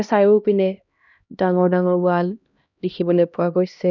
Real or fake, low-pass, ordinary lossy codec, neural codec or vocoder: fake; 7.2 kHz; none; codec, 16 kHz, 1 kbps, X-Codec, HuBERT features, trained on LibriSpeech